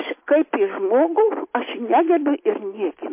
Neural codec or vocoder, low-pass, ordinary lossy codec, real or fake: none; 3.6 kHz; MP3, 24 kbps; real